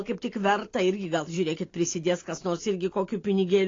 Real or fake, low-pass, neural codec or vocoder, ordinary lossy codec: real; 7.2 kHz; none; AAC, 32 kbps